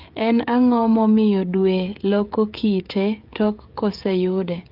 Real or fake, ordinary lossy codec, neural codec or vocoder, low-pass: fake; Opus, 24 kbps; codec, 16 kHz, 16 kbps, FreqCodec, smaller model; 5.4 kHz